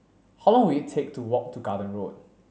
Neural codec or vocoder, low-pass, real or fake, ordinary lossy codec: none; none; real; none